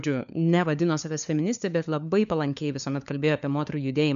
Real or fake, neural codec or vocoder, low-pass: fake; codec, 16 kHz, 4 kbps, FunCodec, trained on LibriTTS, 50 frames a second; 7.2 kHz